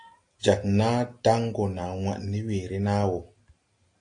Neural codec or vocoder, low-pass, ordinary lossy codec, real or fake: none; 9.9 kHz; AAC, 48 kbps; real